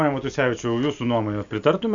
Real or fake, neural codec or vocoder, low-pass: real; none; 7.2 kHz